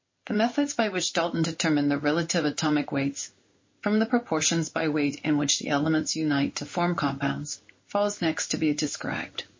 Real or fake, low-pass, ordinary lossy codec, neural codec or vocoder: fake; 7.2 kHz; MP3, 32 kbps; codec, 16 kHz in and 24 kHz out, 1 kbps, XY-Tokenizer